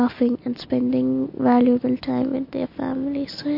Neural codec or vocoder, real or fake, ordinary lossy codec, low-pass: none; real; MP3, 32 kbps; 5.4 kHz